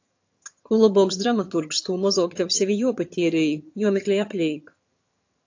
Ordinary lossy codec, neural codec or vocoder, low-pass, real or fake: AAC, 48 kbps; vocoder, 22.05 kHz, 80 mel bands, HiFi-GAN; 7.2 kHz; fake